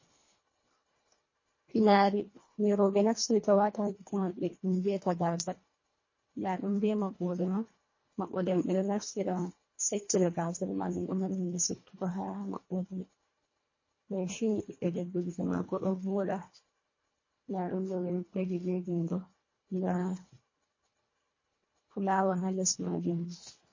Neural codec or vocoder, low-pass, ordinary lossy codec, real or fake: codec, 24 kHz, 1.5 kbps, HILCodec; 7.2 kHz; MP3, 32 kbps; fake